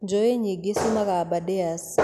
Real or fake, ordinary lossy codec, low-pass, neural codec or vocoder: real; none; 14.4 kHz; none